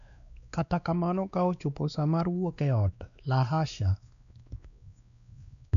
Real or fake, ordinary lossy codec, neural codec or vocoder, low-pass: fake; none; codec, 16 kHz, 2 kbps, X-Codec, WavLM features, trained on Multilingual LibriSpeech; 7.2 kHz